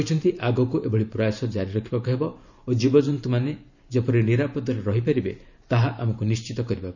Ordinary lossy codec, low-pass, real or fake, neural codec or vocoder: none; 7.2 kHz; fake; vocoder, 44.1 kHz, 128 mel bands every 512 samples, BigVGAN v2